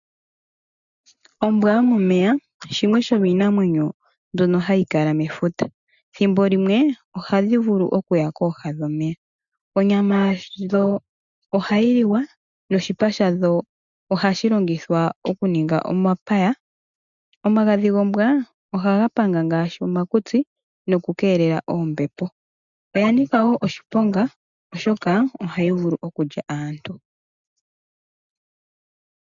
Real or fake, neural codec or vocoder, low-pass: real; none; 7.2 kHz